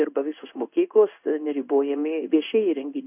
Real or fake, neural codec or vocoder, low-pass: fake; codec, 16 kHz in and 24 kHz out, 1 kbps, XY-Tokenizer; 3.6 kHz